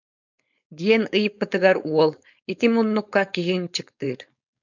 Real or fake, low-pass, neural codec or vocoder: fake; 7.2 kHz; codec, 16 kHz, 4.8 kbps, FACodec